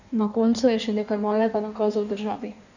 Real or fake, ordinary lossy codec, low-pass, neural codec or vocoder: fake; none; 7.2 kHz; codec, 16 kHz in and 24 kHz out, 1.1 kbps, FireRedTTS-2 codec